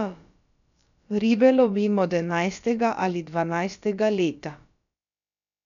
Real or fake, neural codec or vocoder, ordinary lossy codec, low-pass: fake; codec, 16 kHz, about 1 kbps, DyCAST, with the encoder's durations; none; 7.2 kHz